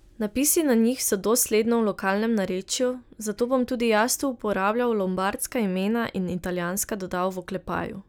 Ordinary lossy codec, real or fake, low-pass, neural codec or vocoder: none; real; none; none